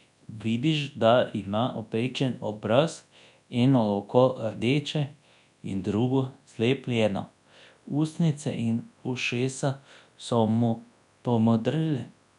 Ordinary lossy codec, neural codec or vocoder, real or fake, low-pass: none; codec, 24 kHz, 0.9 kbps, WavTokenizer, large speech release; fake; 10.8 kHz